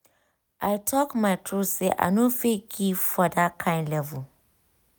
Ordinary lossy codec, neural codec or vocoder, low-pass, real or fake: none; none; none; real